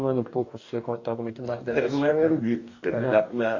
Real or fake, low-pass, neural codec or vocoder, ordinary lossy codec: fake; 7.2 kHz; codec, 44.1 kHz, 2.6 kbps, DAC; AAC, 48 kbps